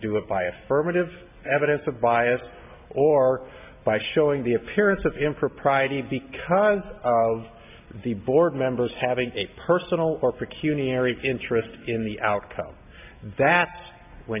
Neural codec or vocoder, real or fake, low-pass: none; real; 3.6 kHz